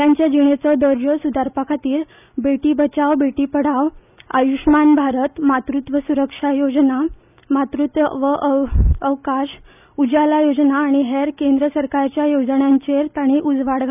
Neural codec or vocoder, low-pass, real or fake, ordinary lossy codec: none; 3.6 kHz; real; none